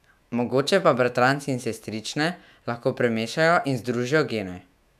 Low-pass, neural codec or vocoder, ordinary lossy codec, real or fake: 14.4 kHz; autoencoder, 48 kHz, 128 numbers a frame, DAC-VAE, trained on Japanese speech; none; fake